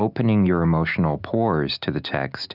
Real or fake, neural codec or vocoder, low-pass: real; none; 5.4 kHz